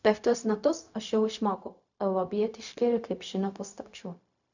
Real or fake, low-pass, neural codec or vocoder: fake; 7.2 kHz; codec, 16 kHz, 0.4 kbps, LongCat-Audio-Codec